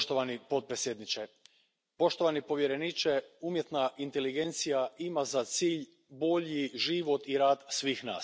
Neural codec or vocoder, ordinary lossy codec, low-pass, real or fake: none; none; none; real